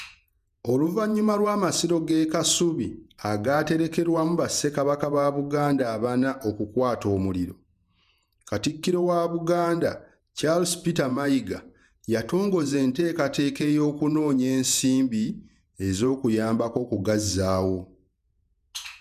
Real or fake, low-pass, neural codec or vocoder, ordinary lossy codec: fake; 14.4 kHz; vocoder, 48 kHz, 128 mel bands, Vocos; none